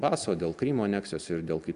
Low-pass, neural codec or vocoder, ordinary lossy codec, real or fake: 10.8 kHz; none; AAC, 96 kbps; real